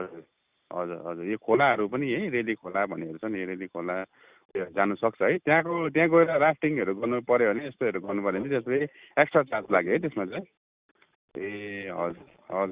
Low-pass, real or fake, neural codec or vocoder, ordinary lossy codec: 3.6 kHz; real; none; Opus, 64 kbps